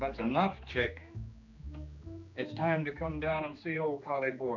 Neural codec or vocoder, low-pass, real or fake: codec, 16 kHz, 2 kbps, X-Codec, HuBERT features, trained on general audio; 7.2 kHz; fake